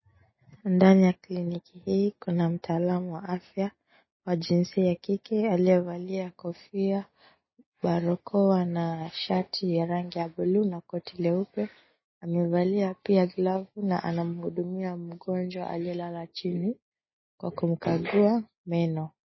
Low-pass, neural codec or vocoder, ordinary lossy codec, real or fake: 7.2 kHz; none; MP3, 24 kbps; real